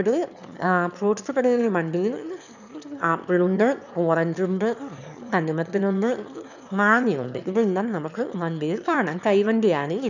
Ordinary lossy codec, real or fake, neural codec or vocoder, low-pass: none; fake; autoencoder, 22.05 kHz, a latent of 192 numbers a frame, VITS, trained on one speaker; 7.2 kHz